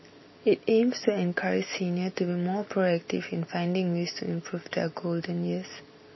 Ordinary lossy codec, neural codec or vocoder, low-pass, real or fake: MP3, 24 kbps; none; 7.2 kHz; real